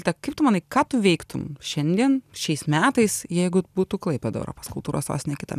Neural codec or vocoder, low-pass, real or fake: none; 14.4 kHz; real